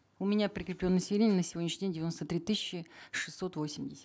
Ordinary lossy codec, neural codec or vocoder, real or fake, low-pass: none; none; real; none